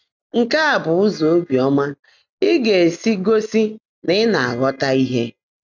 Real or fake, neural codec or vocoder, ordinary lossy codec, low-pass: real; none; none; 7.2 kHz